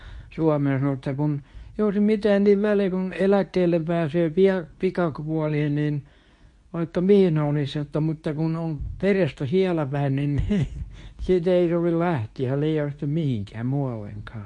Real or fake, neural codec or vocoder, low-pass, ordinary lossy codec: fake; codec, 24 kHz, 0.9 kbps, WavTokenizer, medium speech release version 2; 10.8 kHz; MP3, 48 kbps